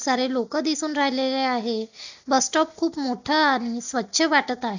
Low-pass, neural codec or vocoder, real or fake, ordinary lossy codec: 7.2 kHz; none; real; none